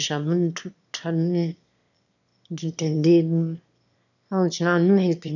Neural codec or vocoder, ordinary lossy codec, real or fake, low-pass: autoencoder, 22.05 kHz, a latent of 192 numbers a frame, VITS, trained on one speaker; none; fake; 7.2 kHz